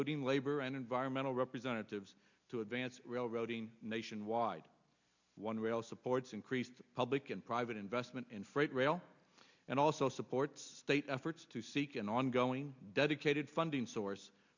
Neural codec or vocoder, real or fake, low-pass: none; real; 7.2 kHz